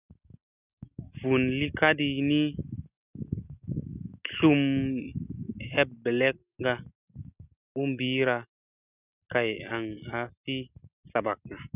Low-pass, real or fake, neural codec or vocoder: 3.6 kHz; real; none